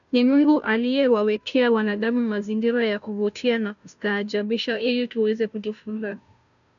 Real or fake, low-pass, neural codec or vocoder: fake; 7.2 kHz; codec, 16 kHz, 0.5 kbps, FunCodec, trained on Chinese and English, 25 frames a second